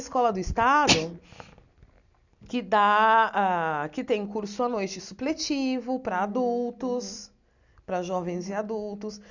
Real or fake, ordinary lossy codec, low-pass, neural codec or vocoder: real; none; 7.2 kHz; none